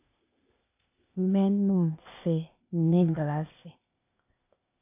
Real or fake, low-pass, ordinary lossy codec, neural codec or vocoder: fake; 3.6 kHz; AAC, 32 kbps; codec, 16 kHz, 0.8 kbps, ZipCodec